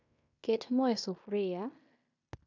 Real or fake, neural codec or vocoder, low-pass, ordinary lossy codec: fake; codec, 16 kHz in and 24 kHz out, 0.9 kbps, LongCat-Audio-Codec, fine tuned four codebook decoder; 7.2 kHz; none